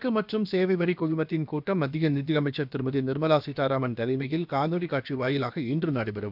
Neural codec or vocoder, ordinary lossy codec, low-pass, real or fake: codec, 16 kHz, about 1 kbps, DyCAST, with the encoder's durations; none; 5.4 kHz; fake